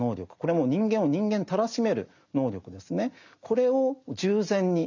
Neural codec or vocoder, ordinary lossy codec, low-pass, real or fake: none; none; 7.2 kHz; real